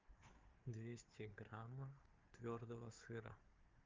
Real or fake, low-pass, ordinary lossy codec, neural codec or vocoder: fake; 7.2 kHz; Opus, 32 kbps; codec, 16 kHz, 4 kbps, FreqCodec, larger model